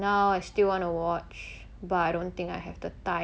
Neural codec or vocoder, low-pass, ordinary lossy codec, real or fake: none; none; none; real